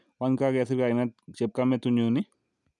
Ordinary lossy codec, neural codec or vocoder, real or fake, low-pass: none; none; real; 10.8 kHz